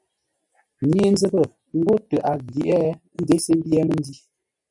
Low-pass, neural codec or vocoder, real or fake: 10.8 kHz; none; real